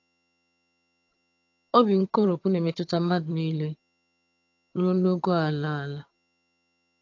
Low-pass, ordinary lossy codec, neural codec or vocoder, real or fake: 7.2 kHz; AAC, 48 kbps; vocoder, 22.05 kHz, 80 mel bands, HiFi-GAN; fake